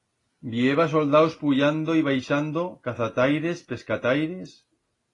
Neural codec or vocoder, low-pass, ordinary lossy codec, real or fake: none; 10.8 kHz; AAC, 32 kbps; real